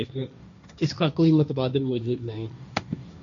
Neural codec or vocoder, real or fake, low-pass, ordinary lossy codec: codec, 16 kHz, 1.1 kbps, Voila-Tokenizer; fake; 7.2 kHz; MP3, 64 kbps